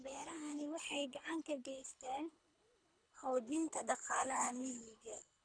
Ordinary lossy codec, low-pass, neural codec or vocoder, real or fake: none; 9.9 kHz; codec, 24 kHz, 3 kbps, HILCodec; fake